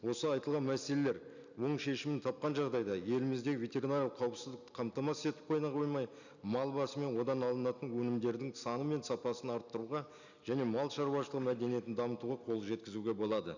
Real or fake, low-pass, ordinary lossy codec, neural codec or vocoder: real; 7.2 kHz; none; none